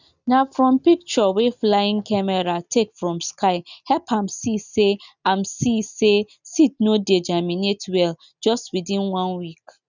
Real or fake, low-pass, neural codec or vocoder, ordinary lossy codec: real; 7.2 kHz; none; none